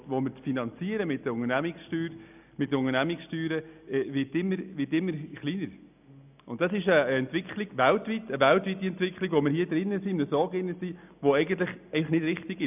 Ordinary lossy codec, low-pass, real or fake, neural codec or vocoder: none; 3.6 kHz; real; none